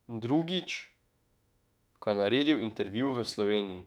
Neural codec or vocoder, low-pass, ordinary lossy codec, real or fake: autoencoder, 48 kHz, 32 numbers a frame, DAC-VAE, trained on Japanese speech; 19.8 kHz; none; fake